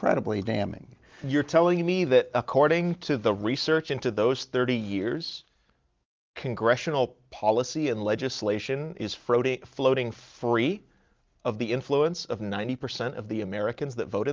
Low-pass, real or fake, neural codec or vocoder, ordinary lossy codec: 7.2 kHz; real; none; Opus, 32 kbps